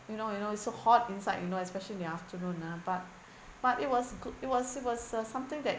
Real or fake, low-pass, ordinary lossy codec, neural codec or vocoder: real; none; none; none